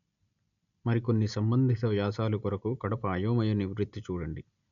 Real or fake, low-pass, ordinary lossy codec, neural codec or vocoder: real; 7.2 kHz; none; none